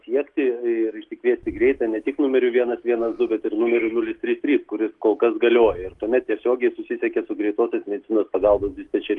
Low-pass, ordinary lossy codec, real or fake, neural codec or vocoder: 10.8 kHz; Opus, 24 kbps; real; none